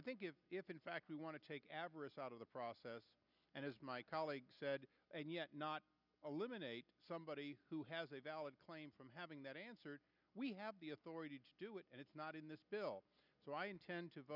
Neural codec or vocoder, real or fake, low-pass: none; real; 5.4 kHz